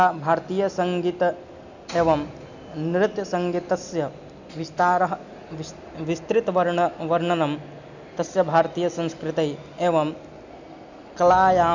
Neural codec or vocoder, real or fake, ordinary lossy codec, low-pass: none; real; none; 7.2 kHz